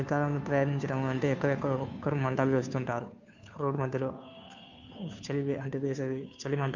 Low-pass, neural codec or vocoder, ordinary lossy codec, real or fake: 7.2 kHz; codec, 16 kHz, 2 kbps, FunCodec, trained on Chinese and English, 25 frames a second; none; fake